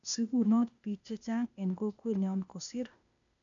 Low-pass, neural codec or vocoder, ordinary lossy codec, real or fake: 7.2 kHz; codec, 16 kHz, 0.8 kbps, ZipCodec; none; fake